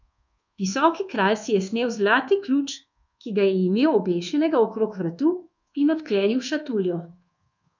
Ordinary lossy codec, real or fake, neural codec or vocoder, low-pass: none; fake; codec, 24 kHz, 1.2 kbps, DualCodec; 7.2 kHz